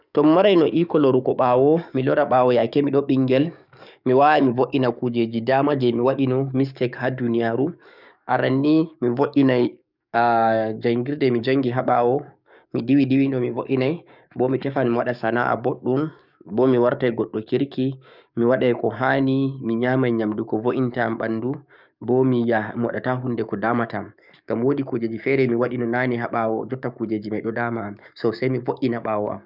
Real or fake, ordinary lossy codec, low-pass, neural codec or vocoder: fake; none; 5.4 kHz; codec, 44.1 kHz, 7.8 kbps, DAC